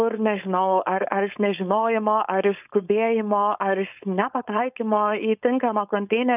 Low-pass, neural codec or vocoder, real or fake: 3.6 kHz; codec, 16 kHz, 4.8 kbps, FACodec; fake